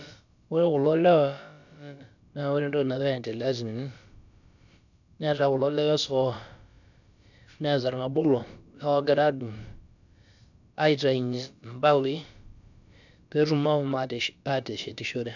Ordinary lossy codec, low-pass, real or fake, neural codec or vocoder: none; 7.2 kHz; fake; codec, 16 kHz, about 1 kbps, DyCAST, with the encoder's durations